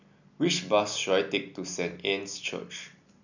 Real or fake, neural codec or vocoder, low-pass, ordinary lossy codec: real; none; 7.2 kHz; none